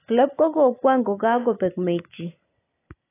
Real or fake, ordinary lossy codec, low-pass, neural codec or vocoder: real; AAC, 24 kbps; 3.6 kHz; none